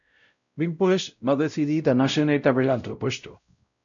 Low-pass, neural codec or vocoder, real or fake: 7.2 kHz; codec, 16 kHz, 0.5 kbps, X-Codec, WavLM features, trained on Multilingual LibriSpeech; fake